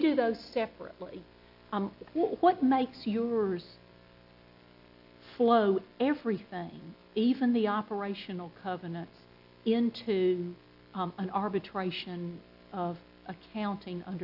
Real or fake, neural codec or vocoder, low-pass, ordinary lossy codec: real; none; 5.4 kHz; AAC, 48 kbps